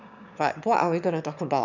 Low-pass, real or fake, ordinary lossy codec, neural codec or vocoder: 7.2 kHz; fake; none; autoencoder, 22.05 kHz, a latent of 192 numbers a frame, VITS, trained on one speaker